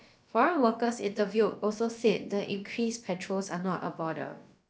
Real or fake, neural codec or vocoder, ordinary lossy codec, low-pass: fake; codec, 16 kHz, about 1 kbps, DyCAST, with the encoder's durations; none; none